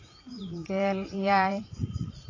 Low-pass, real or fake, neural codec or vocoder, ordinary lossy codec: 7.2 kHz; fake; vocoder, 44.1 kHz, 80 mel bands, Vocos; AAC, 32 kbps